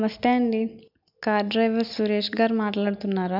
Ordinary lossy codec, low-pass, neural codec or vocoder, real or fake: none; 5.4 kHz; none; real